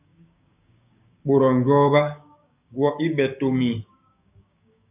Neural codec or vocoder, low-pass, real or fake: codec, 16 kHz, 6 kbps, DAC; 3.6 kHz; fake